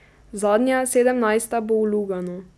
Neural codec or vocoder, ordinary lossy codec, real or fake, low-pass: none; none; real; none